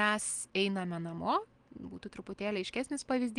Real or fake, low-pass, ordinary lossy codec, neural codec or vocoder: real; 9.9 kHz; Opus, 24 kbps; none